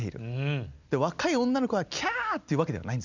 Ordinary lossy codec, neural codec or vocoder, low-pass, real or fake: none; none; 7.2 kHz; real